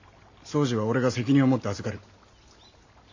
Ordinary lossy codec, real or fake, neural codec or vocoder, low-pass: AAC, 48 kbps; real; none; 7.2 kHz